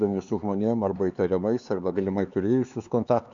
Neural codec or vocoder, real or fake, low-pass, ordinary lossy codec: codec, 16 kHz, 4 kbps, X-Codec, HuBERT features, trained on LibriSpeech; fake; 7.2 kHz; Opus, 64 kbps